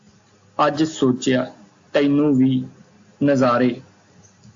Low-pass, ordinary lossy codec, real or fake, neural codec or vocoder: 7.2 kHz; AAC, 48 kbps; real; none